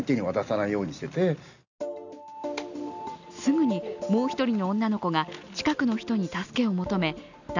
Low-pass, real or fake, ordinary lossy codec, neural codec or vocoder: 7.2 kHz; real; none; none